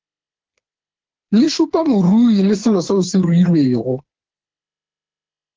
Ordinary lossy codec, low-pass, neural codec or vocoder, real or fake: Opus, 16 kbps; 7.2 kHz; codec, 16 kHz, 4 kbps, FreqCodec, smaller model; fake